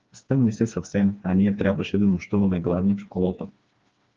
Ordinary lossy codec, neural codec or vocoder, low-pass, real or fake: Opus, 32 kbps; codec, 16 kHz, 2 kbps, FreqCodec, smaller model; 7.2 kHz; fake